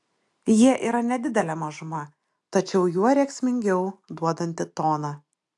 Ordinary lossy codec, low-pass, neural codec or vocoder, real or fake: AAC, 64 kbps; 10.8 kHz; none; real